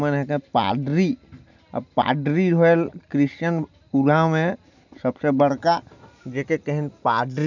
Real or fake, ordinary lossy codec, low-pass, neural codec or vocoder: real; none; 7.2 kHz; none